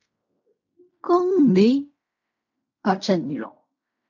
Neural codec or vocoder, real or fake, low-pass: codec, 16 kHz in and 24 kHz out, 0.4 kbps, LongCat-Audio-Codec, fine tuned four codebook decoder; fake; 7.2 kHz